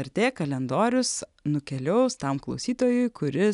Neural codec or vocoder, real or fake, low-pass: none; real; 10.8 kHz